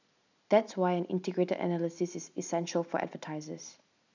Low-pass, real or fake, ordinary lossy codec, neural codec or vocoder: 7.2 kHz; real; none; none